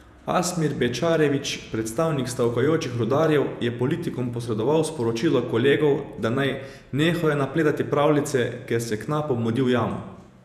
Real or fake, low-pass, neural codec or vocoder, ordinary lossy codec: fake; 14.4 kHz; vocoder, 48 kHz, 128 mel bands, Vocos; none